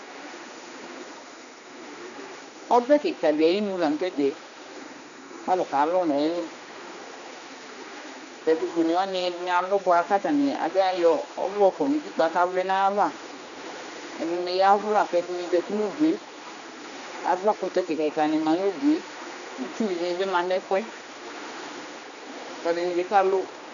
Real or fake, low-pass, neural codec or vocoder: fake; 7.2 kHz; codec, 16 kHz, 2 kbps, X-Codec, HuBERT features, trained on general audio